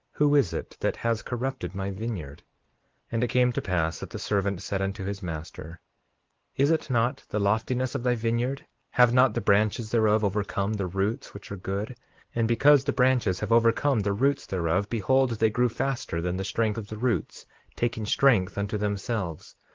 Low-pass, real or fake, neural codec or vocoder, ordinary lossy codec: 7.2 kHz; real; none; Opus, 16 kbps